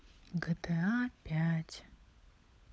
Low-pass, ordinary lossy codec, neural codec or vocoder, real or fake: none; none; codec, 16 kHz, 16 kbps, FunCodec, trained on LibriTTS, 50 frames a second; fake